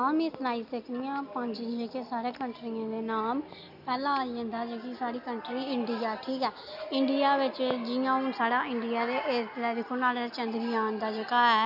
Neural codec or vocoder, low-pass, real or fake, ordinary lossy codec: none; 5.4 kHz; real; none